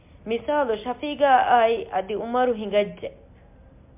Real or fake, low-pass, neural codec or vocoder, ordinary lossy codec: real; 3.6 kHz; none; MP3, 32 kbps